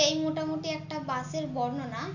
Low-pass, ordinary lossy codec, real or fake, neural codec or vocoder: 7.2 kHz; none; real; none